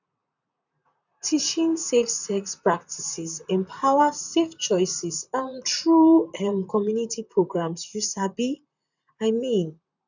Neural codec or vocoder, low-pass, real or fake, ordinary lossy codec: vocoder, 44.1 kHz, 128 mel bands, Pupu-Vocoder; 7.2 kHz; fake; none